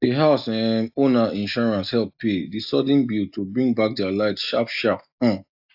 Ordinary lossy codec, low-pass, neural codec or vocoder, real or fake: AAC, 48 kbps; 5.4 kHz; none; real